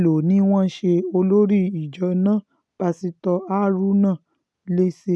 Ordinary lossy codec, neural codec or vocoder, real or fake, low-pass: none; none; real; none